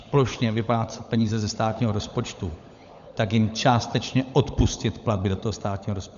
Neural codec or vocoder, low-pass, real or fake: codec, 16 kHz, 16 kbps, FunCodec, trained on LibriTTS, 50 frames a second; 7.2 kHz; fake